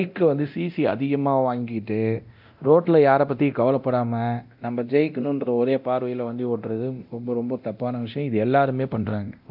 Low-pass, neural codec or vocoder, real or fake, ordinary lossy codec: 5.4 kHz; codec, 24 kHz, 0.9 kbps, DualCodec; fake; AAC, 48 kbps